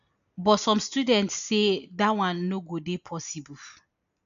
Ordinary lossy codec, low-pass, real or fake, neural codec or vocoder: none; 7.2 kHz; real; none